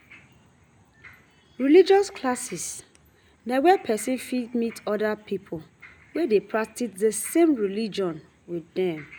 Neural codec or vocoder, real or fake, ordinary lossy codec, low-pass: none; real; none; none